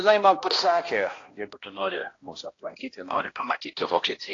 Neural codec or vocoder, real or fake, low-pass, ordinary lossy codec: codec, 16 kHz, 1 kbps, X-Codec, HuBERT features, trained on balanced general audio; fake; 7.2 kHz; AAC, 32 kbps